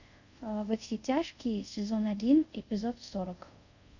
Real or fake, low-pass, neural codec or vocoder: fake; 7.2 kHz; codec, 24 kHz, 0.5 kbps, DualCodec